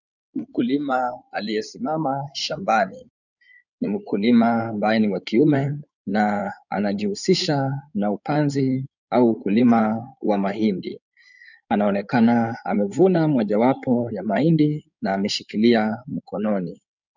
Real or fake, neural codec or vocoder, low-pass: fake; codec, 16 kHz in and 24 kHz out, 2.2 kbps, FireRedTTS-2 codec; 7.2 kHz